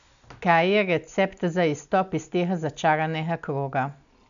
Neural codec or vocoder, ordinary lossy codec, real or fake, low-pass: none; none; real; 7.2 kHz